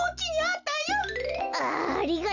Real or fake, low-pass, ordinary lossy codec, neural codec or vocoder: real; 7.2 kHz; none; none